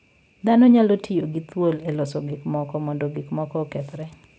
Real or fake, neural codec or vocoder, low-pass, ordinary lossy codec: real; none; none; none